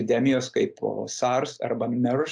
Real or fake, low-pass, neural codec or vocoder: real; 9.9 kHz; none